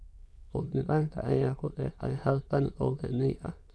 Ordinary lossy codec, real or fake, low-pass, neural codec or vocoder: none; fake; none; autoencoder, 22.05 kHz, a latent of 192 numbers a frame, VITS, trained on many speakers